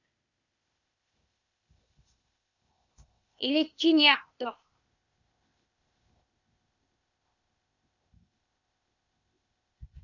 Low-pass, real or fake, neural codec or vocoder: 7.2 kHz; fake; codec, 16 kHz, 0.8 kbps, ZipCodec